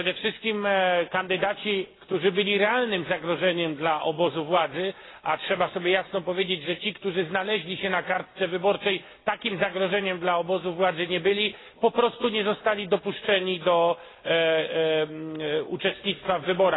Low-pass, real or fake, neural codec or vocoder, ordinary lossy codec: 7.2 kHz; real; none; AAC, 16 kbps